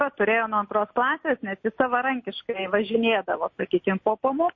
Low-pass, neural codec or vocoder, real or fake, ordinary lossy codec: 7.2 kHz; none; real; MP3, 32 kbps